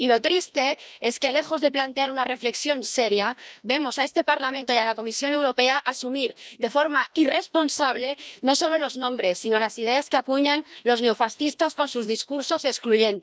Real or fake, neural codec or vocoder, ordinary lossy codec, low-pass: fake; codec, 16 kHz, 1 kbps, FreqCodec, larger model; none; none